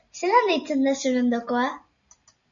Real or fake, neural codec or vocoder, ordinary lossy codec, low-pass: real; none; MP3, 96 kbps; 7.2 kHz